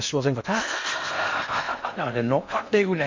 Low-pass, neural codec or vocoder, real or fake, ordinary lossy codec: 7.2 kHz; codec, 16 kHz in and 24 kHz out, 0.6 kbps, FocalCodec, streaming, 4096 codes; fake; MP3, 48 kbps